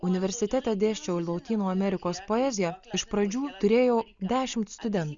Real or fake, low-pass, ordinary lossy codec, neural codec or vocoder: real; 7.2 kHz; Opus, 64 kbps; none